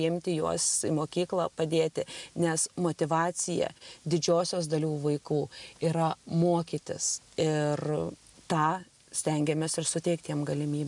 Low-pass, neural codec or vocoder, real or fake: 10.8 kHz; none; real